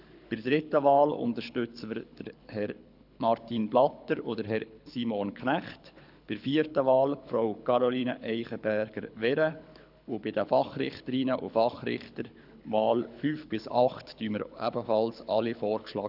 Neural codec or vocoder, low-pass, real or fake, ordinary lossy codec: codec, 24 kHz, 6 kbps, HILCodec; 5.4 kHz; fake; none